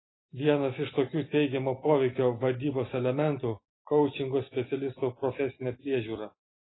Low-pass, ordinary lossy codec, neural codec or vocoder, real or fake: 7.2 kHz; AAC, 16 kbps; none; real